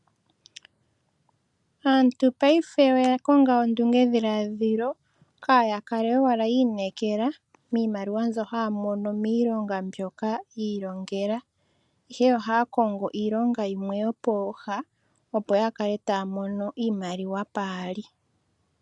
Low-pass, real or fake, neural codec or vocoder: 10.8 kHz; real; none